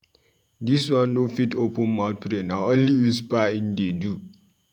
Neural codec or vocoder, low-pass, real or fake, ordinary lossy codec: vocoder, 48 kHz, 128 mel bands, Vocos; 19.8 kHz; fake; none